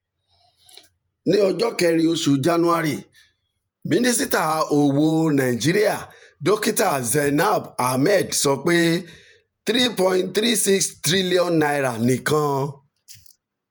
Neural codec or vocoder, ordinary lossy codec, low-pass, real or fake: vocoder, 48 kHz, 128 mel bands, Vocos; none; none; fake